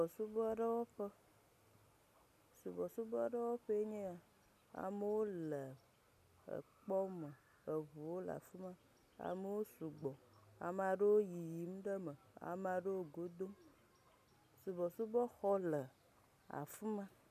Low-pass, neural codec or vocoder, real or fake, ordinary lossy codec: 14.4 kHz; none; real; MP3, 96 kbps